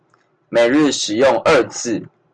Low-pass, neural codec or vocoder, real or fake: 9.9 kHz; none; real